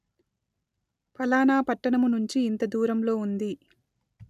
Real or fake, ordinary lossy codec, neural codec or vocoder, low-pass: real; none; none; 14.4 kHz